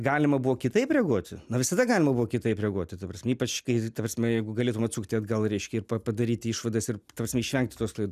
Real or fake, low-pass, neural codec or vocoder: real; 14.4 kHz; none